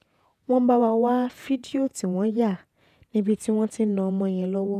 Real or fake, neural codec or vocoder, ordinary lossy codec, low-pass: fake; vocoder, 48 kHz, 128 mel bands, Vocos; none; 14.4 kHz